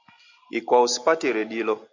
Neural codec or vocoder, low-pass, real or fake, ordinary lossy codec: none; 7.2 kHz; real; AAC, 48 kbps